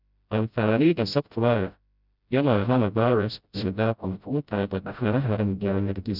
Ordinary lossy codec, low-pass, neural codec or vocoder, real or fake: none; 5.4 kHz; codec, 16 kHz, 0.5 kbps, FreqCodec, smaller model; fake